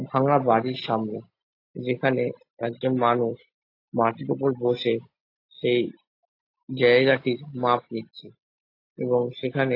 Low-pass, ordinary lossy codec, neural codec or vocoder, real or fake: 5.4 kHz; AAC, 32 kbps; none; real